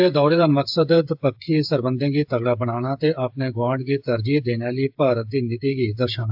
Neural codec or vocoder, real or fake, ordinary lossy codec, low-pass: codec, 16 kHz, 8 kbps, FreqCodec, smaller model; fake; AAC, 48 kbps; 5.4 kHz